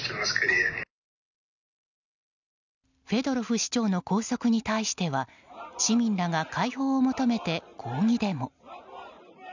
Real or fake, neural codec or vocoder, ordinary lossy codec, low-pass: real; none; none; 7.2 kHz